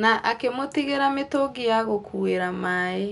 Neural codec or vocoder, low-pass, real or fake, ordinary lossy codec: none; 10.8 kHz; real; none